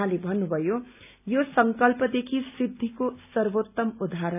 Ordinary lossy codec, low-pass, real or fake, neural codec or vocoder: none; 3.6 kHz; real; none